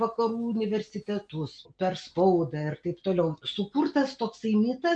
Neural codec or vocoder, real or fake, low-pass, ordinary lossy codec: none; real; 9.9 kHz; MP3, 64 kbps